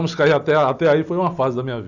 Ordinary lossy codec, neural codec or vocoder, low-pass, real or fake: none; none; 7.2 kHz; real